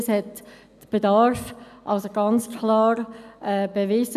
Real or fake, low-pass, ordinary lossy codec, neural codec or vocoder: real; 14.4 kHz; none; none